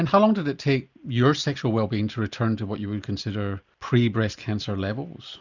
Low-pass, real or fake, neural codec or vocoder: 7.2 kHz; real; none